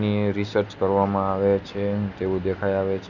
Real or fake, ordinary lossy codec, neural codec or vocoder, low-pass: real; none; none; 7.2 kHz